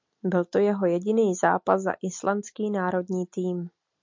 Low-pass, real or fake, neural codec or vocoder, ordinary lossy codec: 7.2 kHz; real; none; MP3, 48 kbps